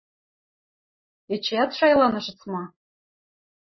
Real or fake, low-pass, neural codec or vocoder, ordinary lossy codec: real; 7.2 kHz; none; MP3, 24 kbps